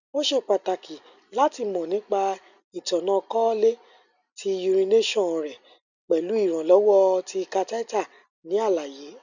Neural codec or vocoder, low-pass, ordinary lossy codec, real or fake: none; 7.2 kHz; none; real